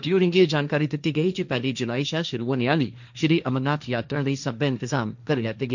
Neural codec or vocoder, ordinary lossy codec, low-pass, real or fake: codec, 16 kHz, 1.1 kbps, Voila-Tokenizer; none; 7.2 kHz; fake